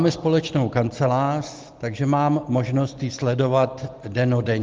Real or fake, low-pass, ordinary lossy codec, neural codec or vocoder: real; 7.2 kHz; Opus, 16 kbps; none